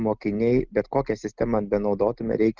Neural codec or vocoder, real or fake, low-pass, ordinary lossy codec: none; real; 7.2 kHz; Opus, 32 kbps